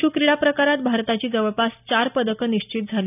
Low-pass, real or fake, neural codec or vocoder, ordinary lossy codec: 3.6 kHz; real; none; none